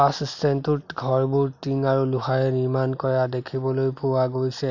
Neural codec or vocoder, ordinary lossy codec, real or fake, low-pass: none; none; real; 7.2 kHz